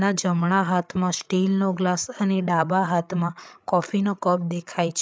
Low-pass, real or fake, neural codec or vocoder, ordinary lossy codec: none; fake; codec, 16 kHz, 8 kbps, FreqCodec, larger model; none